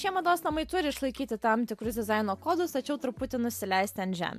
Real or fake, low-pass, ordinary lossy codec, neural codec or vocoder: real; 14.4 kHz; AAC, 96 kbps; none